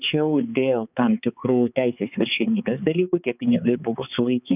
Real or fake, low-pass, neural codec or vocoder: fake; 3.6 kHz; codec, 16 kHz, 4 kbps, X-Codec, HuBERT features, trained on balanced general audio